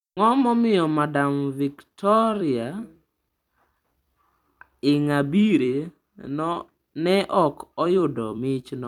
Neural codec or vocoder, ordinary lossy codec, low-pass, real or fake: vocoder, 44.1 kHz, 128 mel bands every 256 samples, BigVGAN v2; none; 19.8 kHz; fake